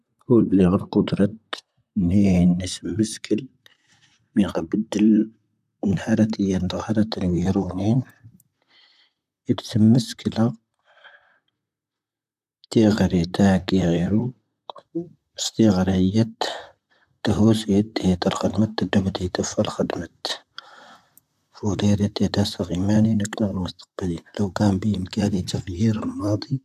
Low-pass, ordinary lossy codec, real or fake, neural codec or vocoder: 14.4 kHz; none; fake; vocoder, 44.1 kHz, 128 mel bands, Pupu-Vocoder